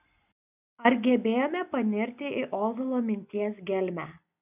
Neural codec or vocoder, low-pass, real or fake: none; 3.6 kHz; real